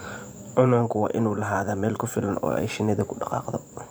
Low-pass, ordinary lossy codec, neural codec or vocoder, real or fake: none; none; vocoder, 44.1 kHz, 128 mel bands every 512 samples, BigVGAN v2; fake